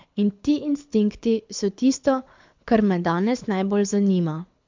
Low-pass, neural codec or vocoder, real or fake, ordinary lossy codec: 7.2 kHz; codec, 24 kHz, 6 kbps, HILCodec; fake; MP3, 64 kbps